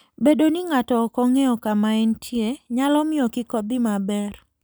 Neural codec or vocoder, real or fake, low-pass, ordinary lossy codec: none; real; none; none